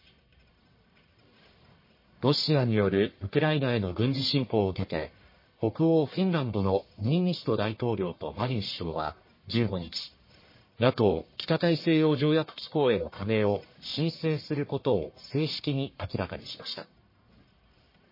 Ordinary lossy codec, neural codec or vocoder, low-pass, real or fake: MP3, 24 kbps; codec, 44.1 kHz, 1.7 kbps, Pupu-Codec; 5.4 kHz; fake